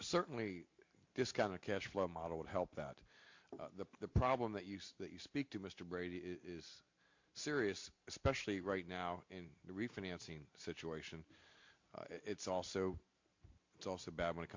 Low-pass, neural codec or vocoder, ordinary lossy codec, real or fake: 7.2 kHz; none; MP3, 48 kbps; real